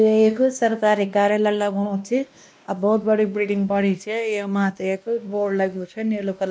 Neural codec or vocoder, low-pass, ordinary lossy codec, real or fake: codec, 16 kHz, 1 kbps, X-Codec, WavLM features, trained on Multilingual LibriSpeech; none; none; fake